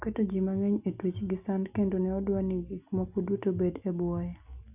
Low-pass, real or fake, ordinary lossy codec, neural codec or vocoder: 3.6 kHz; real; none; none